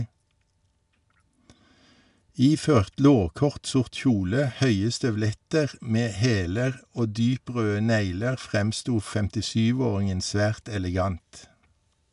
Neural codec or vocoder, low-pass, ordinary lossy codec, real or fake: none; 10.8 kHz; none; real